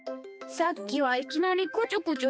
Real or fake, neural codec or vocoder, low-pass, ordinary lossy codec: fake; codec, 16 kHz, 2 kbps, X-Codec, HuBERT features, trained on balanced general audio; none; none